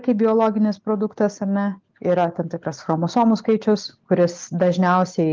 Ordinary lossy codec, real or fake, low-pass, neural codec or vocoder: Opus, 32 kbps; real; 7.2 kHz; none